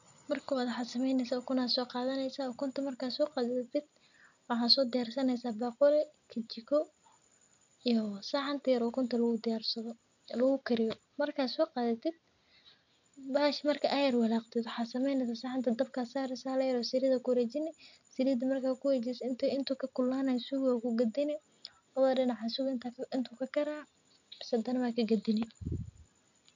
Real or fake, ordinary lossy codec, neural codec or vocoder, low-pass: real; none; none; 7.2 kHz